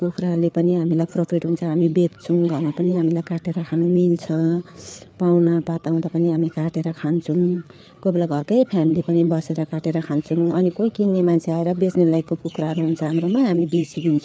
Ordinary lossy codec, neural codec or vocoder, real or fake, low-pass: none; codec, 16 kHz, 4 kbps, FreqCodec, larger model; fake; none